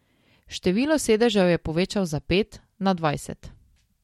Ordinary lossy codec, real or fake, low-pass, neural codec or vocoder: MP3, 64 kbps; real; 19.8 kHz; none